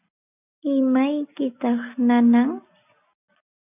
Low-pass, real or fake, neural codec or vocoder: 3.6 kHz; real; none